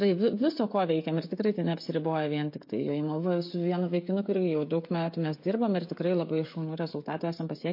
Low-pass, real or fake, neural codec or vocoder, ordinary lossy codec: 5.4 kHz; fake; codec, 16 kHz, 16 kbps, FreqCodec, smaller model; MP3, 32 kbps